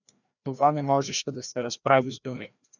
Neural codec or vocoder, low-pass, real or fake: codec, 16 kHz, 1 kbps, FreqCodec, larger model; 7.2 kHz; fake